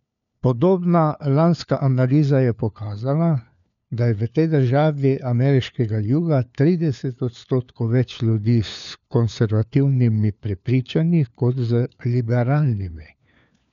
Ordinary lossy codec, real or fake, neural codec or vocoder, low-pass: none; fake; codec, 16 kHz, 4 kbps, FunCodec, trained on LibriTTS, 50 frames a second; 7.2 kHz